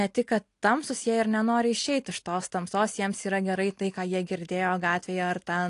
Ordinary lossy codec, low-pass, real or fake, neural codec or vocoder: AAC, 48 kbps; 10.8 kHz; real; none